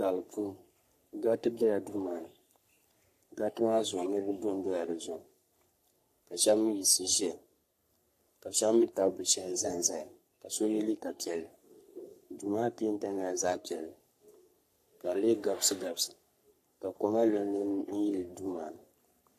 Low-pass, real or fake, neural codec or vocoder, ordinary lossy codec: 14.4 kHz; fake; codec, 44.1 kHz, 3.4 kbps, Pupu-Codec; AAC, 64 kbps